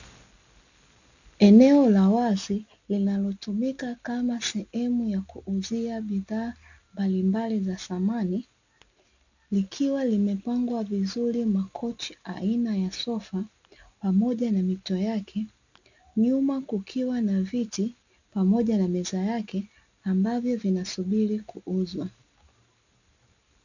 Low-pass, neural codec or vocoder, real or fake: 7.2 kHz; none; real